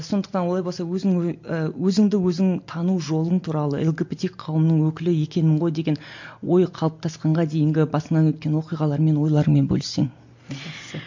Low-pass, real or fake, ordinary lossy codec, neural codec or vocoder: 7.2 kHz; real; MP3, 48 kbps; none